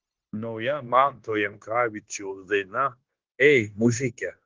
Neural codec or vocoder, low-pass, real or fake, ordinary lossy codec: codec, 16 kHz, 0.9 kbps, LongCat-Audio-Codec; 7.2 kHz; fake; Opus, 16 kbps